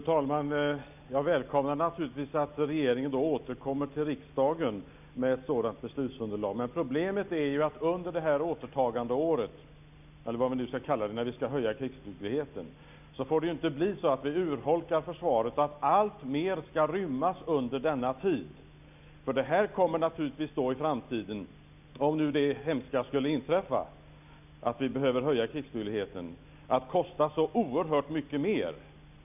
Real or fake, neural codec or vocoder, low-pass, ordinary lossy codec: real; none; 3.6 kHz; none